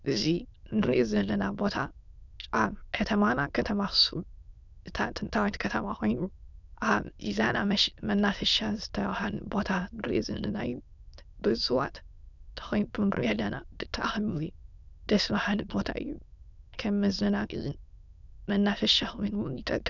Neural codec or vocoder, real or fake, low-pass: autoencoder, 22.05 kHz, a latent of 192 numbers a frame, VITS, trained on many speakers; fake; 7.2 kHz